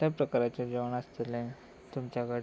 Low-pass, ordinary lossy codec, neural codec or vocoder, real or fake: none; none; none; real